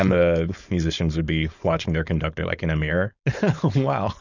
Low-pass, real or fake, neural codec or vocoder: 7.2 kHz; fake; codec, 16 kHz, 4.8 kbps, FACodec